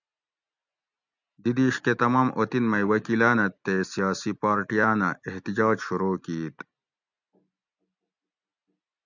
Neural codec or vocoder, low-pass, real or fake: none; 7.2 kHz; real